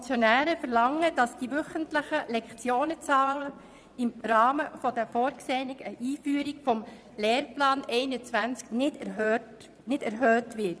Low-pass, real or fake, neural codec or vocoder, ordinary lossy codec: none; fake; vocoder, 22.05 kHz, 80 mel bands, Vocos; none